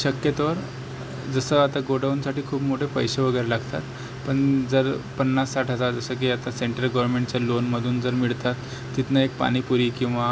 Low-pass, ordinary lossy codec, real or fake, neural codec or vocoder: none; none; real; none